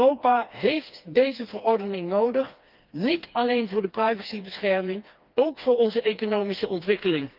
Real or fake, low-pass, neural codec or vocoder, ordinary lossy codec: fake; 5.4 kHz; codec, 16 kHz, 2 kbps, FreqCodec, smaller model; Opus, 24 kbps